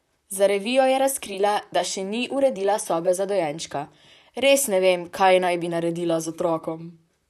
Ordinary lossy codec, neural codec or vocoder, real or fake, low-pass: none; vocoder, 44.1 kHz, 128 mel bands, Pupu-Vocoder; fake; 14.4 kHz